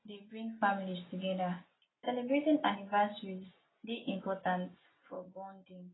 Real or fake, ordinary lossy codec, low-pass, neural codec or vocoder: real; AAC, 16 kbps; 7.2 kHz; none